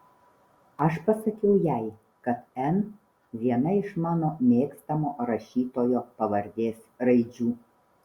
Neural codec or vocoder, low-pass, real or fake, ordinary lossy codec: none; 19.8 kHz; real; Opus, 64 kbps